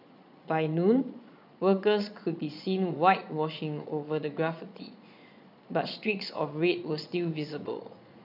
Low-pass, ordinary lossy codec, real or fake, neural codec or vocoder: 5.4 kHz; none; fake; vocoder, 22.05 kHz, 80 mel bands, Vocos